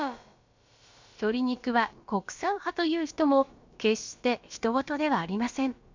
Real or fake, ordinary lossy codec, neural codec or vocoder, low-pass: fake; MP3, 64 kbps; codec, 16 kHz, about 1 kbps, DyCAST, with the encoder's durations; 7.2 kHz